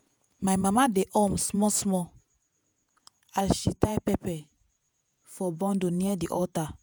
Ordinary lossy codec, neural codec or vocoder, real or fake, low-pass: none; vocoder, 48 kHz, 128 mel bands, Vocos; fake; none